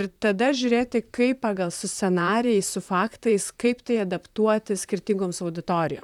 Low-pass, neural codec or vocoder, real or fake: 19.8 kHz; vocoder, 44.1 kHz, 128 mel bands, Pupu-Vocoder; fake